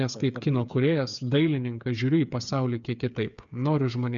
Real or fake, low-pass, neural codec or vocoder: fake; 7.2 kHz; codec, 16 kHz, 8 kbps, FreqCodec, smaller model